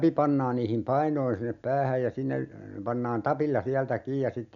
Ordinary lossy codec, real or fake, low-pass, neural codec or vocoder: none; real; 7.2 kHz; none